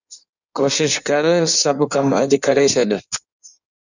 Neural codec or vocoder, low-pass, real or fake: codec, 16 kHz in and 24 kHz out, 1.1 kbps, FireRedTTS-2 codec; 7.2 kHz; fake